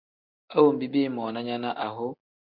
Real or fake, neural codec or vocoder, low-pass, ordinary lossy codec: real; none; 5.4 kHz; Opus, 64 kbps